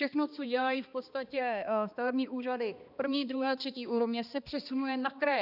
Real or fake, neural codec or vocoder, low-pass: fake; codec, 16 kHz, 2 kbps, X-Codec, HuBERT features, trained on balanced general audio; 5.4 kHz